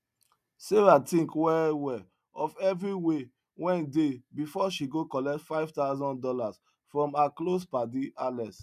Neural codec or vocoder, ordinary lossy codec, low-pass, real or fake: none; none; 14.4 kHz; real